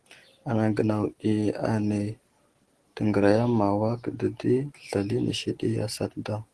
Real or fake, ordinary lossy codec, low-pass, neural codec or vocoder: real; Opus, 16 kbps; 10.8 kHz; none